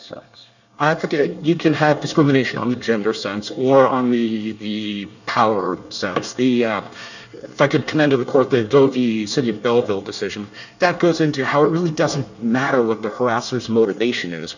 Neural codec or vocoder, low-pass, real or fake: codec, 24 kHz, 1 kbps, SNAC; 7.2 kHz; fake